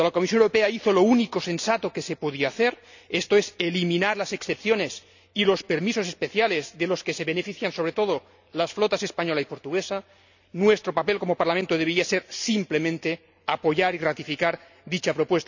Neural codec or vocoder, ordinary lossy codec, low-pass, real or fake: none; none; 7.2 kHz; real